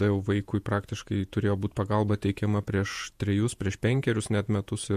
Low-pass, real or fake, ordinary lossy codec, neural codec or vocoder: 14.4 kHz; fake; MP3, 64 kbps; vocoder, 48 kHz, 128 mel bands, Vocos